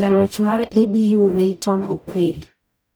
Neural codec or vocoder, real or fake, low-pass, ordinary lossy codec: codec, 44.1 kHz, 0.9 kbps, DAC; fake; none; none